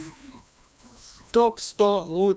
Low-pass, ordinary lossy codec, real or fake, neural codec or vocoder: none; none; fake; codec, 16 kHz, 1 kbps, FreqCodec, larger model